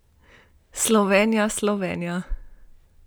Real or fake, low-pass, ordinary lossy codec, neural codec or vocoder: real; none; none; none